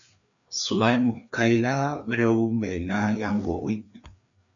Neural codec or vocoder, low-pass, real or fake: codec, 16 kHz, 2 kbps, FreqCodec, larger model; 7.2 kHz; fake